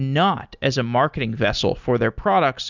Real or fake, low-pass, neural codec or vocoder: real; 7.2 kHz; none